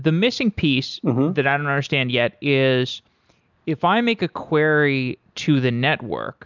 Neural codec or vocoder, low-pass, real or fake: none; 7.2 kHz; real